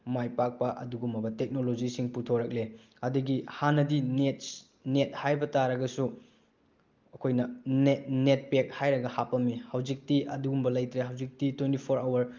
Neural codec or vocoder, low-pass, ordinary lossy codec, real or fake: none; 7.2 kHz; Opus, 32 kbps; real